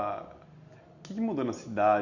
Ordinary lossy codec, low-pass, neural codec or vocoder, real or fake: none; 7.2 kHz; none; real